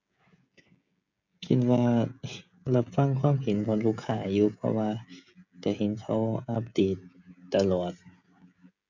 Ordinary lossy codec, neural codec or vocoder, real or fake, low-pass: none; codec, 16 kHz, 16 kbps, FreqCodec, smaller model; fake; 7.2 kHz